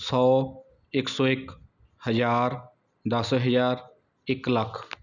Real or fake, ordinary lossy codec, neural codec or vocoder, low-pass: real; none; none; 7.2 kHz